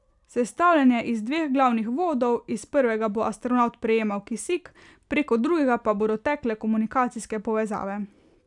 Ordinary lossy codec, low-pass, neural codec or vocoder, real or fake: none; 10.8 kHz; none; real